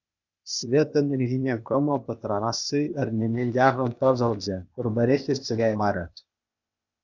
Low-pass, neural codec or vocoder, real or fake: 7.2 kHz; codec, 16 kHz, 0.8 kbps, ZipCodec; fake